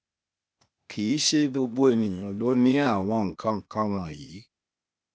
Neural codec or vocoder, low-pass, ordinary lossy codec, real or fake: codec, 16 kHz, 0.8 kbps, ZipCodec; none; none; fake